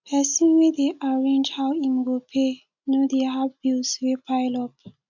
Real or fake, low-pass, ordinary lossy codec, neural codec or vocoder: real; 7.2 kHz; none; none